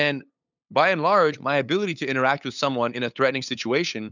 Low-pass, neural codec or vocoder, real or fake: 7.2 kHz; codec, 16 kHz, 8 kbps, FunCodec, trained on LibriTTS, 25 frames a second; fake